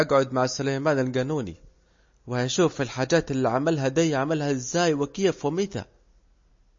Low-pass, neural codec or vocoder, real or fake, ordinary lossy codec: 7.2 kHz; none; real; MP3, 32 kbps